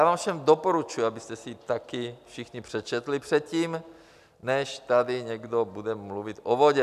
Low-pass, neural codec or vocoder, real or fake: 14.4 kHz; none; real